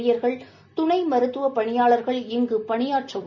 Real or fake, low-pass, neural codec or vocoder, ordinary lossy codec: real; 7.2 kHz; none; none